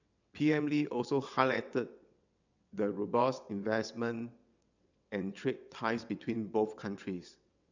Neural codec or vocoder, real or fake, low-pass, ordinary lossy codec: vocoder, 22.05 kHz, 80 mel bands, WaveNeXt; fake; 7.2 kHz; none